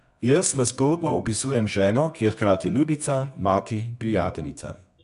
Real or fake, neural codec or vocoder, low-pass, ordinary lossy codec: fake; codec, 24 kHz, 0.9 kbps, WavTokenizer, medium music audio release; 10.8 kHz; none